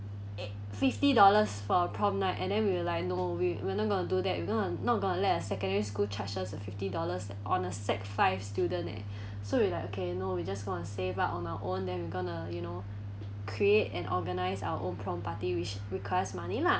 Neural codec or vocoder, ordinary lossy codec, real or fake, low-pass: none; none; real; none